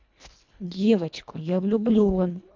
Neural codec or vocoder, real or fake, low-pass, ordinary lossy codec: codec, 24 kHz, 1.5 kbps, HILCodec; fake; 7.2 kHz; MP3, 64 kbps